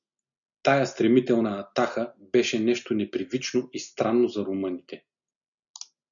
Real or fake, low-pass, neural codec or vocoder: real; 7.2 kHz; none